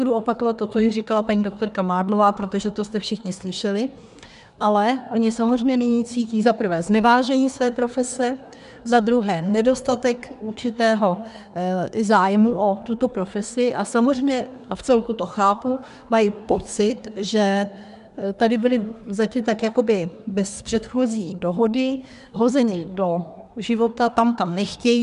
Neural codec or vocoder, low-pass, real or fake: codec, 24 kHz, 1 kbps, SNAC; 10.8 kHz; fake